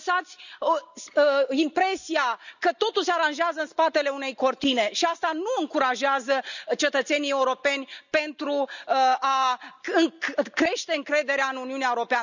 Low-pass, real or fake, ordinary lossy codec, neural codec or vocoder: 7.2 kHz; real; none; none